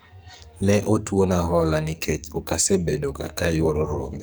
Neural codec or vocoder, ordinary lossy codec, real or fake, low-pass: codec, 44.1 kHz, 2.6 kbps, SNAC; none; fake; none